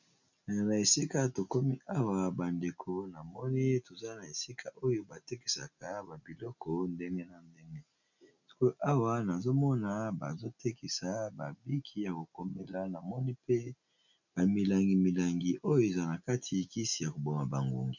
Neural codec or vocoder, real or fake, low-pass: none; real; 7.2 kHz